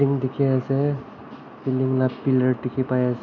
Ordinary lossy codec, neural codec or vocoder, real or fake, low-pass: MP3, 64 kbps; none; real; 7.2 kHz